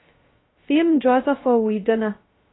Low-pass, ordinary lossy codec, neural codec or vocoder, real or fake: 7.2 kHz; AAC, 16 kbps; codec, 16 kHz, 0.2 kbps, FocalCodec; fake